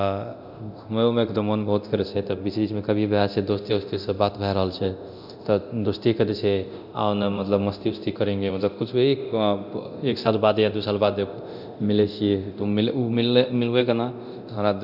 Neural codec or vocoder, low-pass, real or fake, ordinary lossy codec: codec, 24 kHz, 0.9 kbps, DualCodec; 5.4 kHz; fake; none